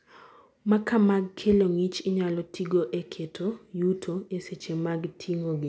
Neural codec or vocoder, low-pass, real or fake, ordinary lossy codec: none; none; real; none